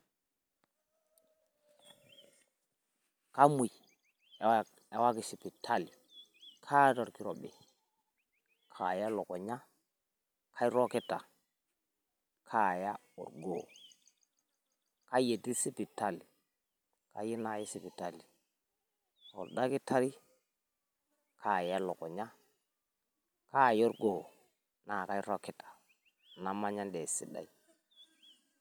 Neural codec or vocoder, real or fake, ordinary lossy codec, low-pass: none; real; none; none